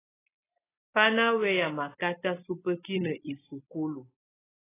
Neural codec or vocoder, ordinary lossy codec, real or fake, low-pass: none; AAC, 16 kbps; real; 3.6 kHz